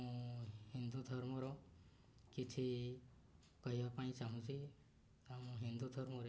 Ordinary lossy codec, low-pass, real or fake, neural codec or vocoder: none; none; real; none